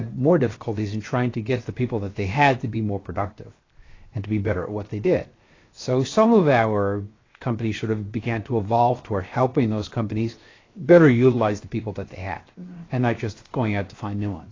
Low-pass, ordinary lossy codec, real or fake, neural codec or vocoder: 7.2 kHz; AAC, 32 kbps; fake; codec, 16 kHz, 0.7 kbps, FocalCodec